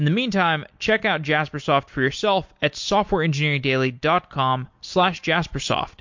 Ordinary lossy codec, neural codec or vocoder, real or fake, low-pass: MP3, 48 kbps; none; real; 7.2 kHz